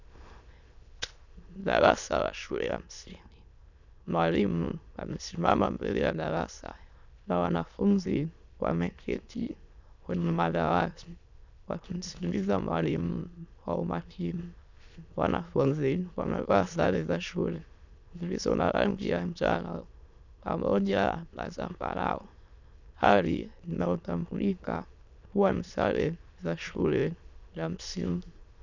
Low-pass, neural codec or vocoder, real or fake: 7.2 kHz; autoencoder, 22.05 kHz, a latent of 192 numbers a frame, VITS, trained on many speakers; fake